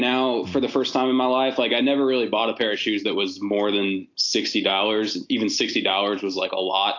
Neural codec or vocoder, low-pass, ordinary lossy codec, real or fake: none; 7.2 kHz; AAC, 48 kbps; real